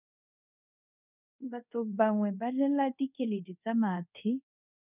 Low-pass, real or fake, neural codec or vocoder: 3.6 kHz; fake; codec, 24 kHz, 0.5 kbps, DualCodec